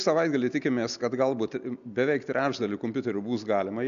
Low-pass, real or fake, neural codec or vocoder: 7.2 kHz; real; none